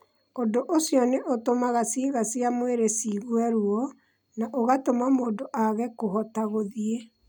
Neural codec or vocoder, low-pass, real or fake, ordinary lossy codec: none; none; real; none